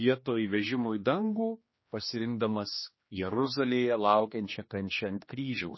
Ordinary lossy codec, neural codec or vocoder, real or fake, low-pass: MP3, 24 kbps; codec, 16 kHz, 2 kbps, X-Codec, HuBERT features, trained on general audio; fake; 7.2 kHz